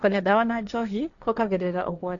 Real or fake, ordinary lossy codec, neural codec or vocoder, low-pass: fake; none; codec, 16 kHz, 1.1 kbps, Voila-Tokenizer; 7.2 kHz